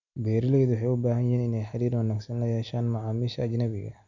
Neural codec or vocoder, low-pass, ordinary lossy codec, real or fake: none; 7.2 kHz; none; real